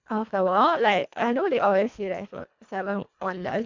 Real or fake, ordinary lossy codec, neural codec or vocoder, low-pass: fake; MP3, 64 kbps; codec, 24 kHz, 1.5 kbps, HILCodec; 7.2 kHz